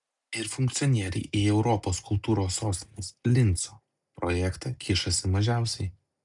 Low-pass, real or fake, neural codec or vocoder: 10.8 kHz; real; none